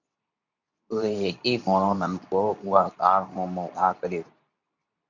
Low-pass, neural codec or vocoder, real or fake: 7.2 kHz; codec, 24 kHz, 0.9 kbps, WavTokenizer, medium speech release version 2; fake